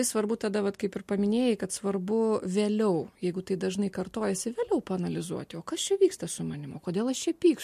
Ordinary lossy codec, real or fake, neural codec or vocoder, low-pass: MP3, 64 kbps; real; none; 14.4 kHz